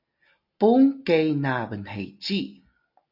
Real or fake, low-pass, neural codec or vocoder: real; 5.4 kHz; none